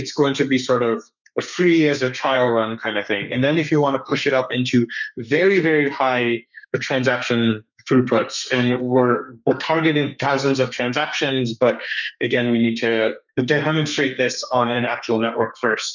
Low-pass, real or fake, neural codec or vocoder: 7.2 kHz; fake; codec, 32 kHz, 1.9 kbps, SNAC